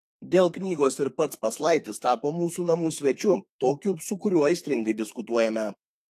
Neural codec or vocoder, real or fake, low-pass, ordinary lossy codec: codec, 32 kHz, 1.9 kbps, SNAC; fake; 14.4 kHz; AAC, 64 kbps